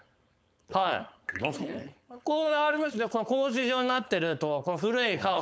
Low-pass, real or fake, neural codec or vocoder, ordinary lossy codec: none; fake; codec, 16 kHz, 4.8 kbps, FACodec; none